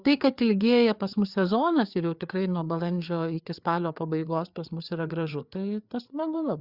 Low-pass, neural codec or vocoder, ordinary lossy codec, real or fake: 5.4 kHz; codec, 16 kHz, 4 kbps, FreqCodec, larger model; Opus, 64 kbps; fake